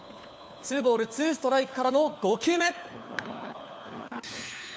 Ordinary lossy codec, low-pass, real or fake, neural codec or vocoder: none; none; fake; codec, 16 kHz, 4 kbps, FunCodec, trained on LibriTTS, 50 frames a second